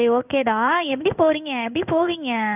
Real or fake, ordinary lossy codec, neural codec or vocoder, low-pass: fake; none; codec, 16 kHz in and 24 kHz out, 1 kbps, XY-Tokenizer; 3.6 kHz